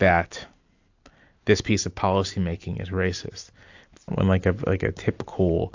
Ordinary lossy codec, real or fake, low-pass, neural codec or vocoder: AAC, 48 kbps; real; 7.2 kHz; none